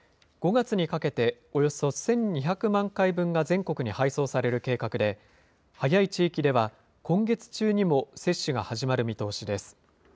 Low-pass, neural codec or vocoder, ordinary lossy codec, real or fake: none; none; none; real